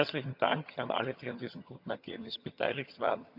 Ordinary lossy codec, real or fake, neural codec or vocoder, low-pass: none; fake; vocoder, 22.05 kHz, 80 mel bands, HiFi-GAN; 5.4 kHz